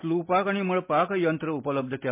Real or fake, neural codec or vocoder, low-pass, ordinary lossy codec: real; none; 3.6 kHz; MP3, 32 kbps